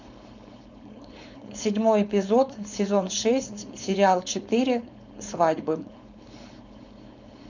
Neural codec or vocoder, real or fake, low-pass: codec, 16 kHz, 4.8 kbps, FACodec; fake; 7.2 kHz